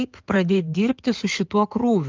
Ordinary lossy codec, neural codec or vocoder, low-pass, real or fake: Opus, 32 kbps; codec, 16 kHz in and 24 kHz out, 2.2 kbps, FireRedTTS-2 codec; 7.2 kHz; fake